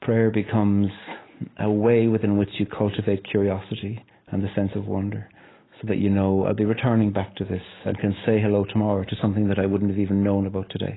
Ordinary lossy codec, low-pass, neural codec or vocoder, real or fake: AAC, 16 kbps; 7.2 kHz; none; real